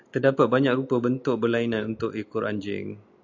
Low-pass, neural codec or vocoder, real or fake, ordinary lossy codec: 7.2 kHz; none; real; AAC, 48 kbps